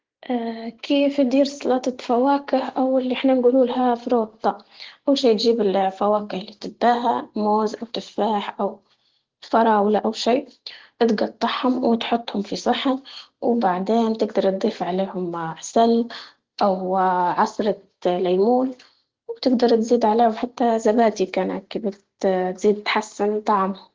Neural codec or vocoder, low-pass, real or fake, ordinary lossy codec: none; 7.2 kHz; real; Opus, 16 kbps